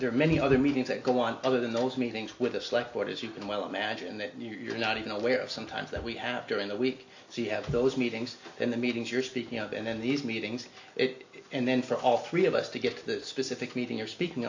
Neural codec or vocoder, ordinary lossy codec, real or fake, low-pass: none; AAC, 48 kbps; real; 7.2 kHz